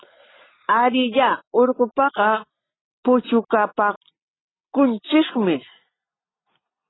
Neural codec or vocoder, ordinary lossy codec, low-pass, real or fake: none; AAC, 16 kbps; 7.2 kHz; real